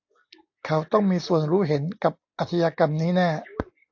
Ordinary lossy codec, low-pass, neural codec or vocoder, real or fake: AAC, 48 kbps; 7.2 kHz; none; real